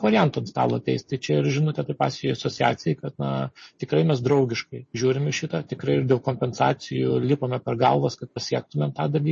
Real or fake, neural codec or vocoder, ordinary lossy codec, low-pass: real; none; MP3, 32 kbps; 10.8 kHz